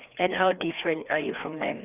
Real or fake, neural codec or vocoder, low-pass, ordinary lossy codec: fake; codec, 16 kHz, 2 kbps, FreqCodec, larger model; 3.6 kHz; none